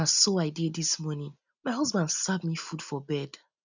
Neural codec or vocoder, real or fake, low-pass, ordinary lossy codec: none; real; 7.2 kHz; none